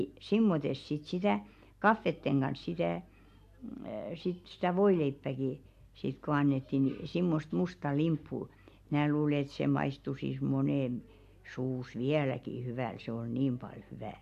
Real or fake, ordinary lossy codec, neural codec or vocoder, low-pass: real; AAC, 96 kbps; none; 14.4 kHz